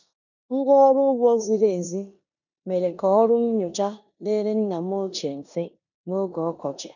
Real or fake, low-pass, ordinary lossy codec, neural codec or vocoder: fake; 7.2 kHz; none; codec, 16 kHz in and 24 kHz out, 0.9 kbps, LongCat-Audio-Codec, four codebook decoder